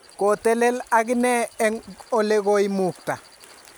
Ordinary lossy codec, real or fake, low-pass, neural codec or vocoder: none; real; none; none